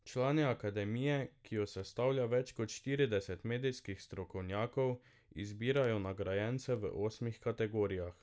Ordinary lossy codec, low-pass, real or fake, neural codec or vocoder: none; none; real; none